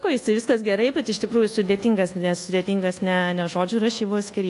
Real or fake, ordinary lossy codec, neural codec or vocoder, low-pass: fake; AAC, 48 kbps; codec, 24 kHz, 1.2 kbps, DualCodec; 10.8 kHz